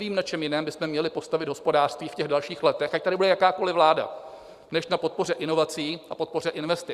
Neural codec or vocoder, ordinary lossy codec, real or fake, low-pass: vocoder, 44.1 kHz, 128 mel bands every 512 samples, BigVGAN v2; Opus, 64 kbps; fake; 14.4 kHz